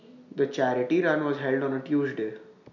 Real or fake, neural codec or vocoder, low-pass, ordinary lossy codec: real; none; 7.2 kHz; none